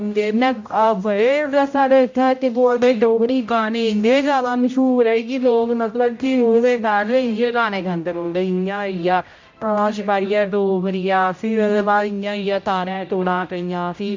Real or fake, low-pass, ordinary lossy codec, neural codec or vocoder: fake; 7.2 kHz; MP3, 48 kbps; codec, 16 kHz, 0.5 kbps, X-Codec, HuBERT features, trained on general audio